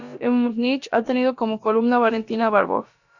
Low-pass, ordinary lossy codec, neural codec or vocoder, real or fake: 7.2 kHz; Opus, 64 kbps; codec, 16 kHz, about 1 kbps, DyCAST, with the encoder's durations; fake